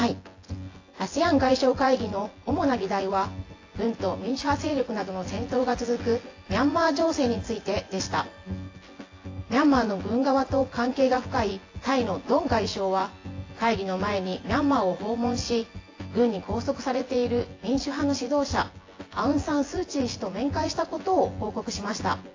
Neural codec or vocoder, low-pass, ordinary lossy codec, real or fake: vocoder, 24 kHz, 100 mel bands, Vocos; 7.2 kHz; AAC, 32 kbps; fake